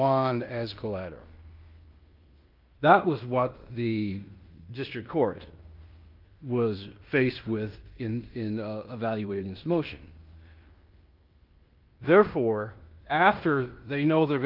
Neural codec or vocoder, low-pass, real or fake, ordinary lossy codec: codec, 16 kHz in and 24 kHz out, 0.9 kbps, LongCat-Audio-Codec, fine tuned four codebook decoder; 5.4 kHz; fake; Opus, 24 kbps